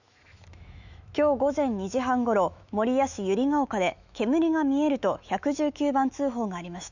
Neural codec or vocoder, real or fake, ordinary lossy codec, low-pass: autoencoder, 48 kHz, 128 numbers a frame, DAC-VAE, trained on Japanese speech; fake; none; 7.2 kHz